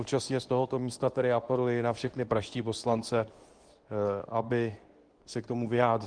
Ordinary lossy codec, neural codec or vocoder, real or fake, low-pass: Opus, 24 kbps; codec, 24 kHz, 0.9 kbps, WavTokenizer, medium speech release version 2; fake; 9.9 kHz